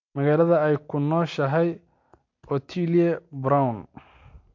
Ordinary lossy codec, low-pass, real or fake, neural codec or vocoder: MP3, 48 kbps; 7.2 kHz; real; none